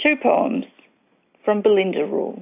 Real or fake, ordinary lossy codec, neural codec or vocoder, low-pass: real; AAC, 24 kbps; none; 3.6 kHz